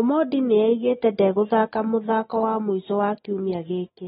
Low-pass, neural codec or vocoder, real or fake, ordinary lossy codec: 19.8 kHz; none; real; AAC, 16 kbps